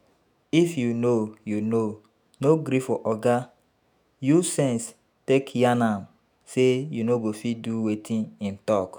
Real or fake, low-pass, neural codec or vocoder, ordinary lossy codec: fake; none; autoencoder, 48 kHz, 128 numbers a frame, DAC-VAE, trained on Japanese speech; none